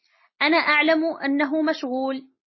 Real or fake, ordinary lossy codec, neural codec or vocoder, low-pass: real; MP3, 24 kbps; none; 7.2 kHz